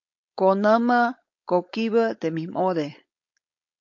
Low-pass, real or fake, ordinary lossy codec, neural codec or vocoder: 7.2 kHz; fake; AAC, 48 kbps; codec, 16 kHz, 4.8 kbps, FACodec